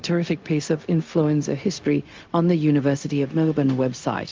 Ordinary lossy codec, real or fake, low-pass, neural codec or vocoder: Opus, 24 kbps; fake; 7.2 kHz; codec, 16 kHz, 0.4 kbps, LongCat-Audio-Codec